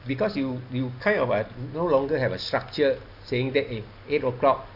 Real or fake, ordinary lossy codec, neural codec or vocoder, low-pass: real; none; none; 5.4 kHz